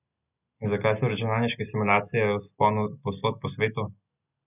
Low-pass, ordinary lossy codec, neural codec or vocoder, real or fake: 3.6 kHz; none; none; real